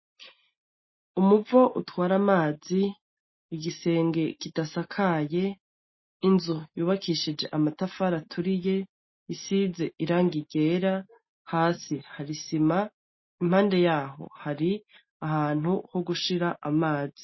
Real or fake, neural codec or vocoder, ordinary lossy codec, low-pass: real; none; MP3, 24 kbps; 7.2 kHz